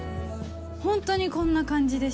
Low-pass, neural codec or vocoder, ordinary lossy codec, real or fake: none; none; none; real